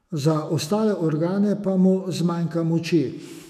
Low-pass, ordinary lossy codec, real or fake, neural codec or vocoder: 14.4 kHz; none; fake; autoencoder, 48 kHz, 128 numbers a frame, DAC-VAE, trained on Japanese speech